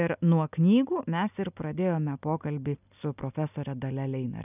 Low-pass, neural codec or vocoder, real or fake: 3.6 kHz; none; real